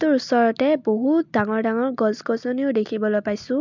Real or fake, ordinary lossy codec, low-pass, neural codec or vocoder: real; MP3, 64 kbps; 7.2 kHz; none